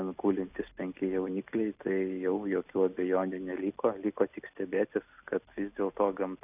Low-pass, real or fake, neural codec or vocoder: 3.6 kHz; real; none